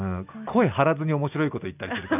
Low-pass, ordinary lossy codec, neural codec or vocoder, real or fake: 3.6 kHz; none; none; real